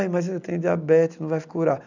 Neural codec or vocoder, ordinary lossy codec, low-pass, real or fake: none; none; 7.2 kHz; real